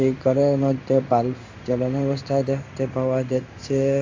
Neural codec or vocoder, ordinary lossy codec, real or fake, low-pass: codec, 16 kHz in and 24 kHz out, 1 kbps, XY-Tokenizer; AAC, 48 kbps; fake; 7.2 kHz